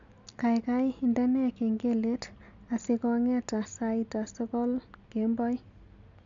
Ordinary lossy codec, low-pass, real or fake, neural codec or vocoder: MP3, 64 kbps; 7.2 kHz; real; none